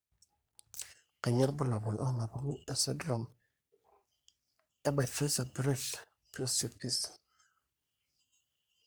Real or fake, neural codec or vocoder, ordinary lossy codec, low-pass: fake; codec, 44.1 kHz, 3.4 kbps, Pupu-Codec; none; none